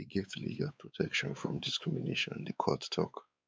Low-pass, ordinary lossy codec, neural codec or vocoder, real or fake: none; none; codec, 16 kHz, 4 kbps, X-Codec, WavLM features, trained on Multilingual LibriSpeech; fake